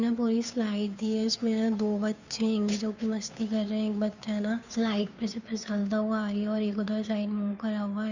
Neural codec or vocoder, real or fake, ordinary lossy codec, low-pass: codec, 16 kHz, 2 kbps, FunCodec, trained on Chinese and English, 25 frames a second; fake; none; 7.2 kHz